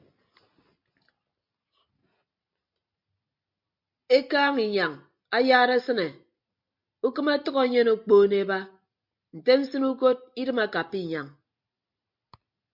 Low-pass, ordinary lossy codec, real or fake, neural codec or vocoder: 5.4 kHz; MP3, 48 kbps; real; none